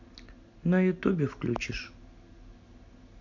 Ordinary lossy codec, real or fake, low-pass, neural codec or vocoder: none; real; 7.2 kHz; none